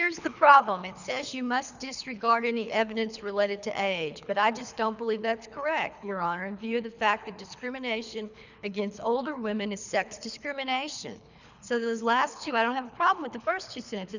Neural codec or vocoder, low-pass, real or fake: codec, 24 kHz, 3 kbps, HILCodec; 7.2 kHz; fake